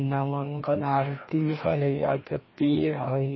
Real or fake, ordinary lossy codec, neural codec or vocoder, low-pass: fake; MP3, 24 kbps; codec, 16 kHz, 1 kbps, FreqCodec, larger model; 7.2 kHz